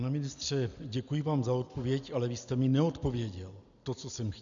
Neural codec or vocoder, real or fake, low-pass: none; real; 7.2 kHz